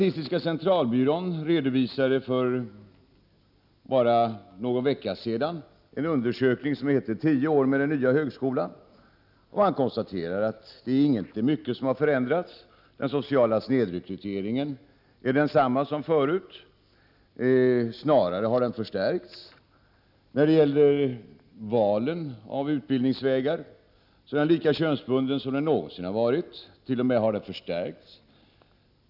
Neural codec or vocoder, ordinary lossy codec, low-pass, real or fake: none; none; 5.4 kHz; real